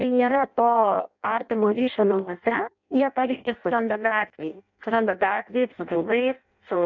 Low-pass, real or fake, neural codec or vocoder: 7.2 kHz; fake; codec, 16 kHz in and 24 kHz out, 0.6 kbps, FireRedTTS-2 codec